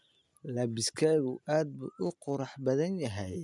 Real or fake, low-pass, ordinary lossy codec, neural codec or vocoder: real; 10.8 kHz; none; none